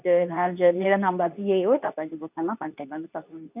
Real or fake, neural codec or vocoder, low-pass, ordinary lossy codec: fake; codec, 24 kHz, 0.9 kbps, WavTokenizer, medium speech release version 2; 3.6 kHz; none